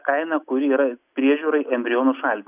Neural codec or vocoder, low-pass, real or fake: none; 3.6 kHz; real